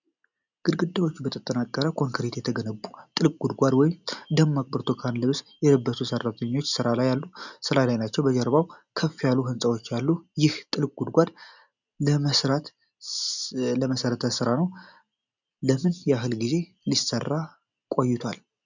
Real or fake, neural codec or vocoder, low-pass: real; none; 7.2 kHz